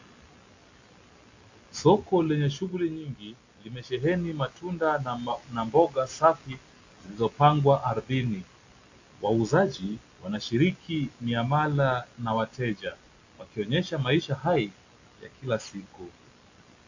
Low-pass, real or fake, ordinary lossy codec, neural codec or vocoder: 7.2 kHz; real; AAC, 48 kbps; none